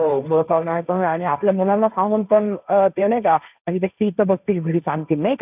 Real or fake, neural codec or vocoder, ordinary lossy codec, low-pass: fake; codec, 16 kHz, 1.1 kbps, Voila-Tokenizer; none; 3.6 kHz